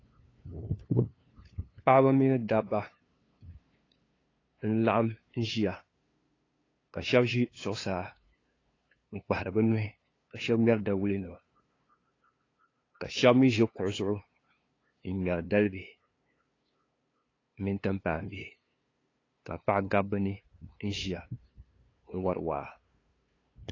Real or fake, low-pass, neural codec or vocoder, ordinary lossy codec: fake; 7.2 kHz; codec, 16 kHz, 2 kbps, FunCodec, trained on LibriTTS, 25 frames a second; AAC, 32 kbps